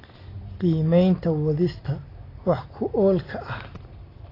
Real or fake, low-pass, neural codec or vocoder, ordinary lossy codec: real; 5.4 kHz; none; AAC, 24 kbps